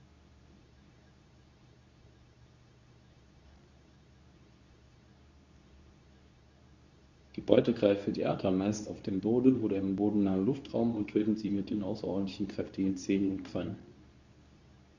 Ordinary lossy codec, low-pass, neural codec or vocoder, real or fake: Opus, 64 kbps; 7.2 kHz; codec, 24 kHz, 0.9 kbps, WavTokenizer, medium speech release version 2; fake